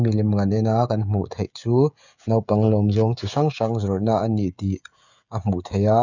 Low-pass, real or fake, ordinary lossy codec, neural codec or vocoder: 7.2 kHz; fake; none; codec, 16 kHz, 16 kbps, FreqCodec, smaller model